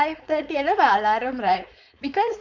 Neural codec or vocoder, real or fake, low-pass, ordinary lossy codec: codec, 16 kHz, 4.8 kbps, FACodec; fake; 7.2 kHz; none